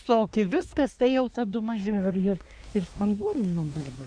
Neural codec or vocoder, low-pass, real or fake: codec, 24 kHz, 1 kbps, SNAC; 9.9 kHz; fake